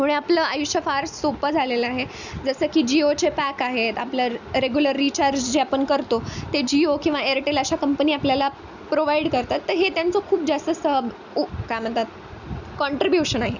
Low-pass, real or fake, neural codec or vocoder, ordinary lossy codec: 7.2 kHz; real; none; none